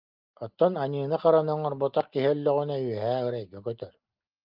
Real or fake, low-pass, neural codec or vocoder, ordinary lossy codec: real; 5.4 kHz; none; Opus, 24 kbps